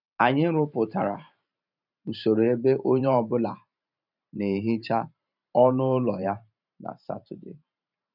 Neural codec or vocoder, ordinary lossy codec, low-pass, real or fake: vocoder, 44.1 kHz, 128 mel bands every 512 samples, BigVGAN v2; none; 5.4 kHz; fake